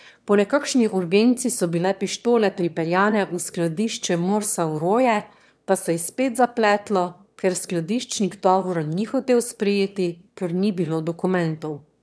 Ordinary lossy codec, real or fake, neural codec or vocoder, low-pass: none; fake; autoencoder, 22.05 kHz, a latent of 192 numbers a frame, VITS, trained on one speaker; none